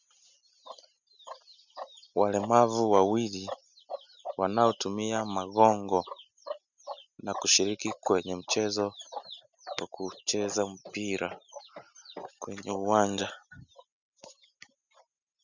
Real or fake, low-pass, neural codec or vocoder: real; 7.2 kHz; none